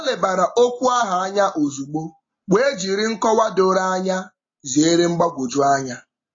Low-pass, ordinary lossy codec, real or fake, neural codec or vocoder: 7.2 kHz; AAC, 32 kbps; real; none